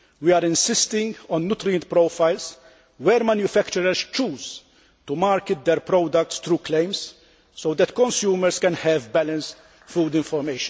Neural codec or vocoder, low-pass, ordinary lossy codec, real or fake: none; none; none; real